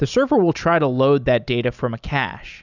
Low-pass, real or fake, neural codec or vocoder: 7.2 kHz; real; none